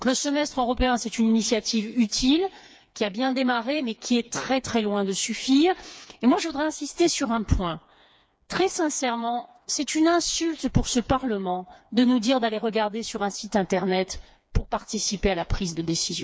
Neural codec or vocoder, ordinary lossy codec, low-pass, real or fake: codec, 16 kHz, 4 kbps, FreqCodec, smaller model; none; none; fake